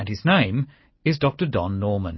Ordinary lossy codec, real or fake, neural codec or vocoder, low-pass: MP3, 24 kbps; real; none; 7.2 kHz